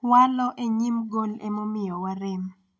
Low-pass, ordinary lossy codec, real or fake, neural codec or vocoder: none; none; real; none